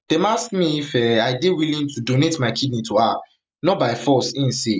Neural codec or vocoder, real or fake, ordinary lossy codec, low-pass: none; real; none; none